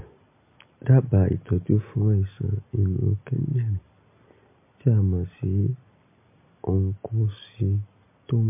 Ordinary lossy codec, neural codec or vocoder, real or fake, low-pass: MP3, 24 kbps; none; real; 3.6 kHz